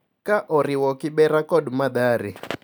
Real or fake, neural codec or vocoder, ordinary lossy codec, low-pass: real; none; none; none